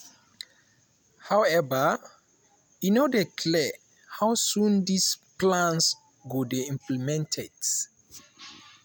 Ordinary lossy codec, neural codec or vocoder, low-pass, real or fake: none; none; none; real